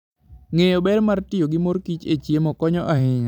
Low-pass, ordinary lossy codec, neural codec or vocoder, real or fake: 19.8 kHz; none; vocoder, 44.1 kHz, 128 mel bands every 256 samples, BigVGAN v2; fake